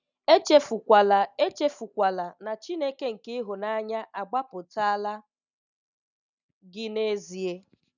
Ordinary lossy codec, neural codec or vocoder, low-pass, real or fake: none; none; 7.2 kHz; real